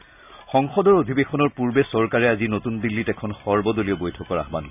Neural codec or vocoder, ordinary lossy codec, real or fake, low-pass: none; none; real; 3.6 kHz